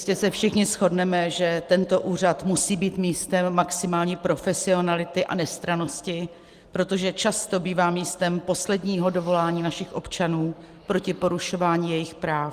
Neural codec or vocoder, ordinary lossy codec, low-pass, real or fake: none; Opus, 24 kbps; 14.4 kHz; real